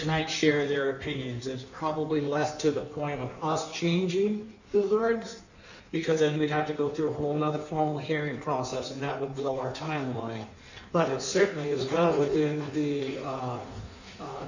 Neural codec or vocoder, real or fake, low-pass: codec, 16 kHz in and 24 kHz out, 1.1 kbps, FireRedTTS-2 codec; fake; 7.2 kHz